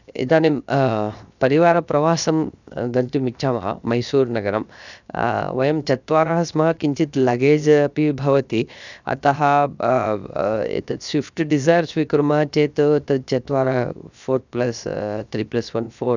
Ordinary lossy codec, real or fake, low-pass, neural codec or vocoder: none; fake; 7.2 kHz; codec, 16 kHz, 0.7 kbps, FocalCodec